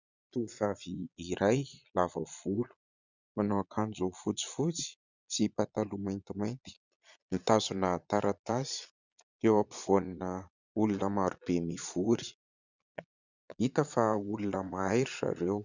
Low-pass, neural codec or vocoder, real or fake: 7.2 kHz; vocoder, 22.05 kHz, 80 mel bands, Vocos; fake